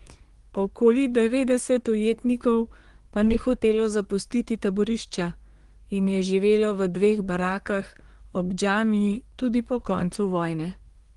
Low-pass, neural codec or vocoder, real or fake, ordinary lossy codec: 10.8 kHz; codec, 24 kHz, 1 kbps, SNAC; fake; Opus, 24 kbps